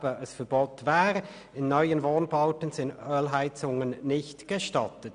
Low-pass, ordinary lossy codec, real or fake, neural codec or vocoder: 9.9 kHz; none; real; none